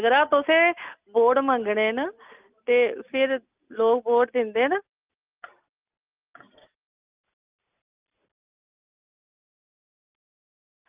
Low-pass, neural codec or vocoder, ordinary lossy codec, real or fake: 3.6 kHz; none; Opus, 24 kbps; real